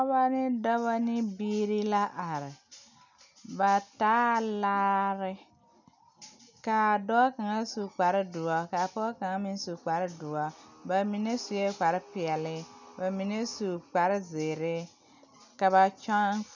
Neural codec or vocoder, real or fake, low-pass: none; real; 7.2 kHz